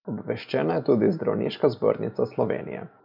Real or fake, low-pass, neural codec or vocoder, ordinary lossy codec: real; 5.4 kHz; none; none